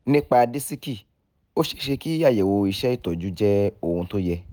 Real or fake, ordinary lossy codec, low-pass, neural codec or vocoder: real; none; none; none